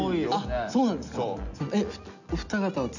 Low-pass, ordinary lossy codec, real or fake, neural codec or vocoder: 7.2 kHz; none; real; none